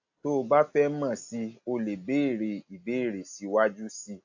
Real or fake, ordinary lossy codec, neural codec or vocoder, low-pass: real; AAC, 48 kbps; none; 7.2 kHz